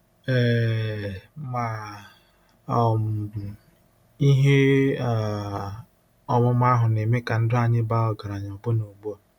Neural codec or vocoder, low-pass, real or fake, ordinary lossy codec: none; 19.8 kHz; real; none